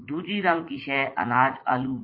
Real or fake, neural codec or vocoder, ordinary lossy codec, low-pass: fake; vocoder, 44.1 kHz, 80 mel bands, Vocos; MP3, 32 kbps; 5.4 kHz